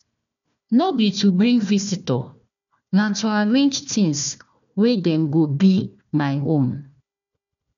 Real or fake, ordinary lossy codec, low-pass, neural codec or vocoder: fake; none; 7.2 kHz; codec, 16 kHz, 1 kbps, FunCodec, trained on Chinese and English, 50 frames a second